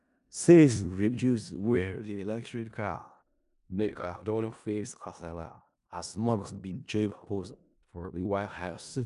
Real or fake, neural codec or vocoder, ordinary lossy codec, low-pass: fake; codec, 16 kHz in and 24 kHz out, 0.4 kbps, LongCat-Audio-Codec, four codebook decoder; none; 10.8 kHz